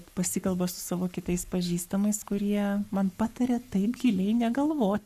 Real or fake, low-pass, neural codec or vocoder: fake; 14.4 kHz; codec, 44.1 kHz, 7.8 kbps, Pupu-Codec